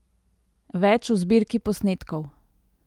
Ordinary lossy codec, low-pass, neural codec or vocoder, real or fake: Opus, 32 kbps; 19.8 kHz; none; real